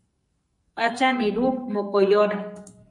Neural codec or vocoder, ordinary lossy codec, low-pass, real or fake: codec, 44.1 kHz, 2.6 kbps, SNAC; MP3, 48 kbps; 10.8 kHz; fake